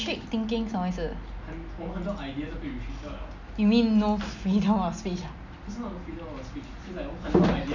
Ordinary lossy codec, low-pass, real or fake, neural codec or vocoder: none; 7.2 kHz; real; none